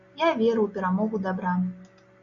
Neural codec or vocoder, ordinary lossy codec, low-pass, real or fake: none; MP3, 96 kbps; 7.2 kHz; real